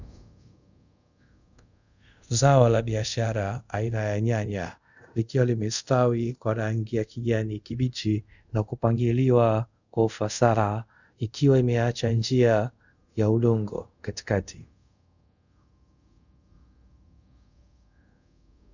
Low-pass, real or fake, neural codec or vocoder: 7.2 kHz; fake; codec, 24 kHz, 0.5 kbps, DualCodec